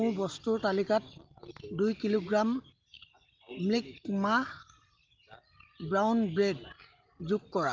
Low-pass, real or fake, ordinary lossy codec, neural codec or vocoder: 7.2 kHz; real; Opus, 32 kbps; none